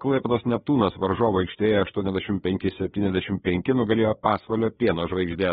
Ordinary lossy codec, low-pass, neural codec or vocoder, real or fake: AAC, 16 kbps; 7.2 kHz; codec, 16 kHz, 4 kbps, FreqCodec, larger model; fake